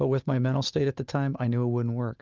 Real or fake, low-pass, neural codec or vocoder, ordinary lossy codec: real; 7.2 kHz; none; Opus, 24 kbps